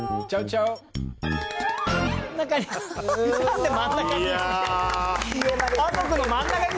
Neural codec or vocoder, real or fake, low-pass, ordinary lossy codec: none; real; none; none